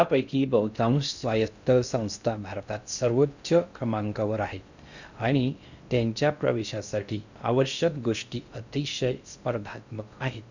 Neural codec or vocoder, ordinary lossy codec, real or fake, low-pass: codec, 16 kHz in and 24 kHz out, 0.6 kbps, FocalCodec, streaming, 2048 codes; none; fake; 7.2 kHz